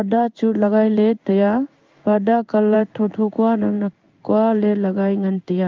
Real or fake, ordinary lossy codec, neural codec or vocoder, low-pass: fake; Opus, 32 kbps; codec, 16 kHz in and 24 kHz out, 1 kbps, XY-Tokenizer; 7.2 kHz